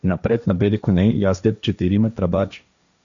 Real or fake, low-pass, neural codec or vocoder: fake; 7.2 kHz; codec, 16 kHz, 1.1 kbps, Voila-Tokenizer